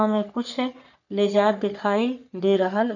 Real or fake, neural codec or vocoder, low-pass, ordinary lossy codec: fake; codec, 44.1 kHz, 3.4 kbps, Pupu-Codec; 7.2 kHz; none